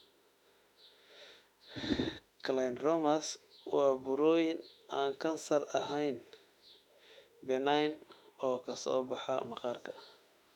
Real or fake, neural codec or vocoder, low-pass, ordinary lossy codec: fake; autoencoder, 48 kHz, 32 numbers a frame, DAC-VAE, trained on Japanese speech; 19.8 kHz; none